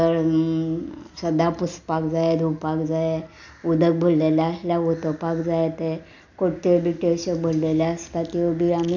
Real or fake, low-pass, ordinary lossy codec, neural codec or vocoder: real; 7.2 kHz; none; none